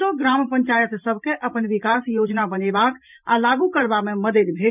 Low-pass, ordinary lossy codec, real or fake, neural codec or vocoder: 3.6 kHz; none; fake; vocoder, 44.1 kHz, 128 mel bands every 512 samples, BigVGAN v2